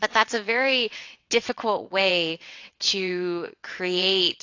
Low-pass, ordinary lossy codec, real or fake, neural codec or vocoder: 7.2 kHz; AAC, 48 kbps; fake; vocoder, 22.05 kHz, 80 mel bands, WaveNeXt